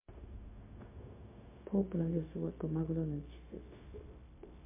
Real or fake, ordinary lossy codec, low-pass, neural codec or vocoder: fake; MP3, 24 kbps; 3.6 kHz; codec, 16 kHz, 0.4 kbps, LongCat-Audio-Codec